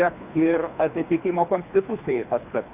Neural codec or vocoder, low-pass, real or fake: codec, 24 kHz, 3 kbps, HILCodec; 3.6 kHz; fake